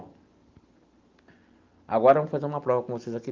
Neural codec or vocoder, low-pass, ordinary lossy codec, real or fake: none; 7.2 kHz; Opus, 32 kbps; real